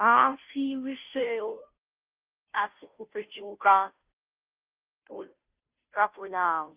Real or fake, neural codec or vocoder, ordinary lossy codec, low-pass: fake; codec, 16 kHz, 0.5 kbps, FunCodec, trained on Chinese and English, 25 frames a second; Opus, 32 kbps; 3.6 kHz